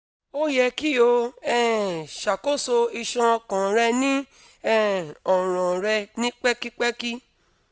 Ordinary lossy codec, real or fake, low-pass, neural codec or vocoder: none; real; none; none